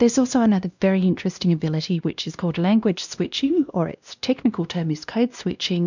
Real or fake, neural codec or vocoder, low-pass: fake; codec, 16 kHz, 1 kbps, X-Codec, WavLM features, trained on Multilingual LibriSpeech; 7.2 kHz